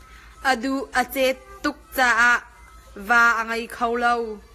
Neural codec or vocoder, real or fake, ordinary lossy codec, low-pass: none; real; AAC, 48 kbps; 14.4 kHz